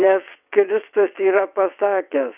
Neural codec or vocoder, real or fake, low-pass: vocoder, 22.05 kHz, 80 mel bands, WaveNeXt; fake; 3.6 kHz